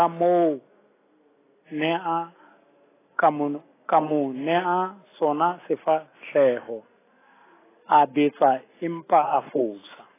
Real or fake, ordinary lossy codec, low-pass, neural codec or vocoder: real; AAC, 16 kbps; 3.6 kHz; none